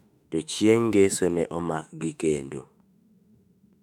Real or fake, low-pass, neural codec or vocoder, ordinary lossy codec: fake; 19.8 kHz; autoencoder, 48 kHz, 32 numbers a frame, DAC-VAE, trained on Japanese speech; none